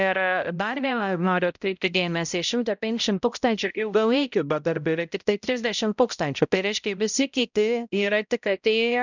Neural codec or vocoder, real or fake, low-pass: codec, 16 kHz, 0.5 kbps, X-Codec, HuBERT features, trained on balanced general audio; fake; 7.2 kHz